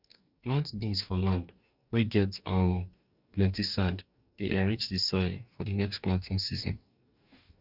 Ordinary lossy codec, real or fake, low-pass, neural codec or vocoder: none; fake; 5.4 kHz; codec, 44.1 kHz, 2.6 kbps, DAC